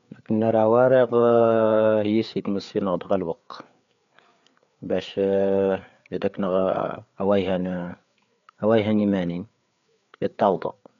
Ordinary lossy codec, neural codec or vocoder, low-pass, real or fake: MP3, 96 kbps; codec, 16 kHz, 4 kbps, FreqCodec, larger model; 7.2 kHz; fake